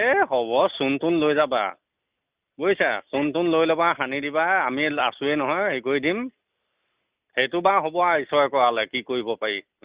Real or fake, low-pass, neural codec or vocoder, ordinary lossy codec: real; 3.6 kHz; none; Opus, 64 kbps